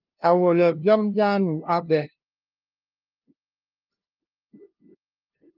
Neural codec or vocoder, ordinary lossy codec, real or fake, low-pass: codec, 16 kHz, 0.5 kbps, FunCodec, trained on LibriTTS, 25 frames a second; Opus, 32 kbps; fake; 5.4 kHz